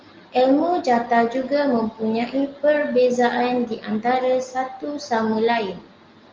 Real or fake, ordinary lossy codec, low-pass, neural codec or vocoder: real; Opus, 24 kbps; 7.2 kHz; none